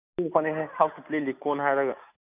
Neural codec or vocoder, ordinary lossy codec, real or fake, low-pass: none; none; real; 3.6 kHz